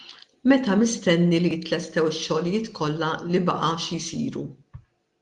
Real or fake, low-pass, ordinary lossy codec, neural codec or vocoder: real; 10.8 kHz; Opus, 24 kbps; none